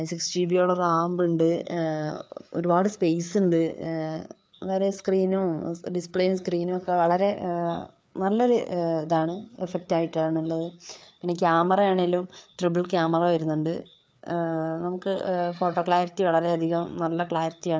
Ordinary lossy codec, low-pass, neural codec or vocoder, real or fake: none; none; codec, 16 kHz, 4 kbps, FreqCodec, larger model; fake